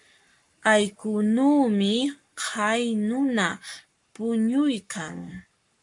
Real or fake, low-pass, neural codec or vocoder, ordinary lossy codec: fake; 10.8 kHz; codec, 44.1 kHz, 7.8 kbps, Pupu-Codec; MP3, 64 kbps